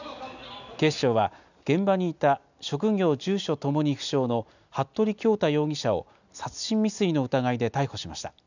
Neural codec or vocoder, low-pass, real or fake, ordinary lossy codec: none; 7.2 kHz; real; none